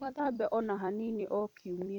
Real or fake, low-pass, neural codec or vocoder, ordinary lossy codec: fake; 19.8 kHz; vocoder, 44.1 kHz, 128 mel bands every 256 samples, BigVGAN v2; none